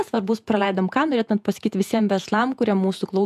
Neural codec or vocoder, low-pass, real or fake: vocoder, 48 kHz, 128 mel bands, Vocos; 14.4 kHz; fake